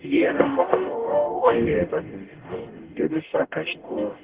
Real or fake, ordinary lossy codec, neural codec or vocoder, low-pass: fake; Opus, 16 kbps; codec, 44.1 kHz, 0.9 kbps, DAC; 3.6 kHz